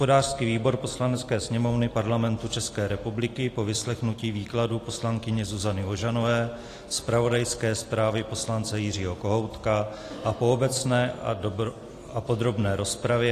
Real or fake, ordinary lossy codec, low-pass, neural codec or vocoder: real; AAC, 48 kbps; 14.4 kHz; none